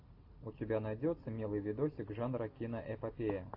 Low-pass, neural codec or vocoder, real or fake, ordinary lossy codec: 5.4 kHz; none; real; AAC, 32 kbps